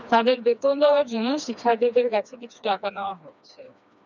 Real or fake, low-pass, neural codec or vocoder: fake; 7.2 kHz; codec, 16 kHz, 2 kbps, FreqCodec, smaller model